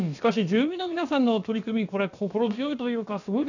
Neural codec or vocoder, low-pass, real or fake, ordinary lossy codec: codec, 16 kHz, about 1 kbps, DyCAST, with the encoder's durations; 7.2 kHz; fake; none